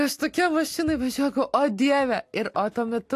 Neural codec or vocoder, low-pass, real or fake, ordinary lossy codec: none; 14.4 kHz; real; AAC, 64 kbps